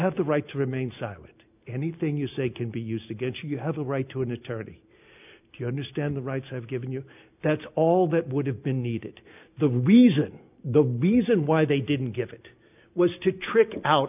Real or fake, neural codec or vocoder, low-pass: real; none; 3.6 kHz